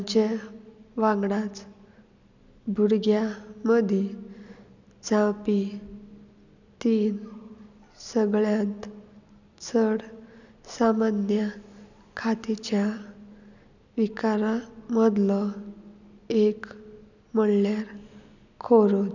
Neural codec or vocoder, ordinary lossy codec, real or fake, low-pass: none; none; real; 7.2 kHz